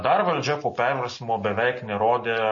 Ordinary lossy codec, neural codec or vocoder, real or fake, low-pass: MP3, 32 kbps; none; real; 7.2 kHz